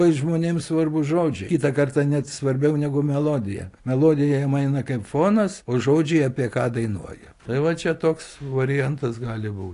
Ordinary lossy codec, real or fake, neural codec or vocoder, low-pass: AAC, 48 kbps; real; none; 10.8 kHz